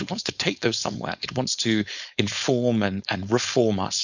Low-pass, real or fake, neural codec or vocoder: 7.2 kHz; fake; codec, 16 kHz, 4.8 kbps, FACodec